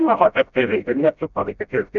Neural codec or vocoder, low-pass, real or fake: codec, 16 kHz, 0.5 kbps, FreqCodec, smaller model; 7.2 kHz; fake